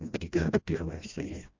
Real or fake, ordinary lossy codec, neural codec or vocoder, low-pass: fake; MP3, 64 kbps; codec, 16 kHz, 1 kbps, FreqCodec, smaller model; 7.2 kHz